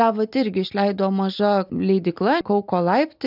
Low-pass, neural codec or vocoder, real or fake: 5.4 kHz; none; real